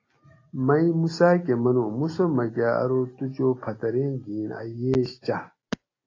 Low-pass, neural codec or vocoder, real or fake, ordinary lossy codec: 7.2 kHz; none; real; AAC, 32 kbps